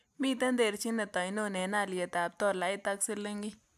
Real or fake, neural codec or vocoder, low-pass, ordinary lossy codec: real; none; 14.4 kHz; AAC, 96 kbps